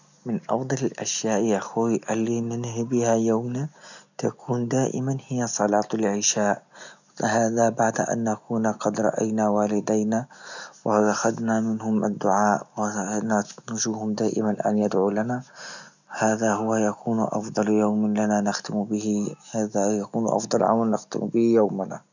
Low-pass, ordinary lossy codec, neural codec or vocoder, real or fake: 7.2 kHz; none; none; real